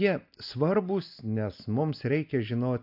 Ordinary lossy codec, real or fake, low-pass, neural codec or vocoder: AAC, 48 kbps; real; 5.4 kHz; none